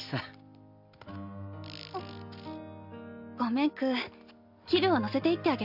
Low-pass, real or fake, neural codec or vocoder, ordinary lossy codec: 5.4 kHz; real; none; none